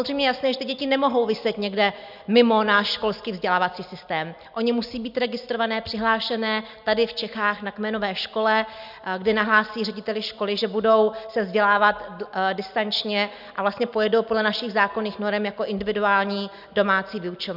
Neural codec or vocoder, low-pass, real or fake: none; 5.4 kHz; real